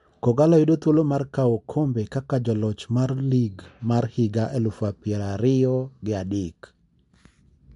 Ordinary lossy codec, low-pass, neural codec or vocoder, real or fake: MP3, 64 kbps; 10.8 kHz; none; real